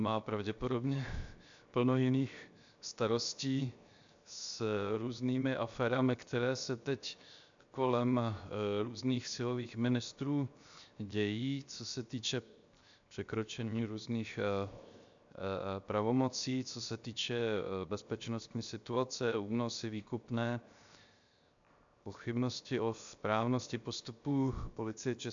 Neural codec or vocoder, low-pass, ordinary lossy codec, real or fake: codec, 16 kHz, 0.7 kbps, FocalCodec; 7.2 kHz; MP3, 64 kbps; fake